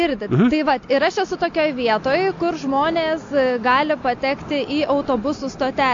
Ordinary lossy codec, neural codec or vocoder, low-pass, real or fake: AAC, 48 kbps; none; 7.2 kHz; real